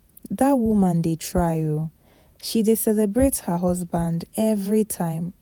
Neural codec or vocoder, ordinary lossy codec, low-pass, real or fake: vocoder, 48 kHz, 128 mel bands, Vocos; none; none; fake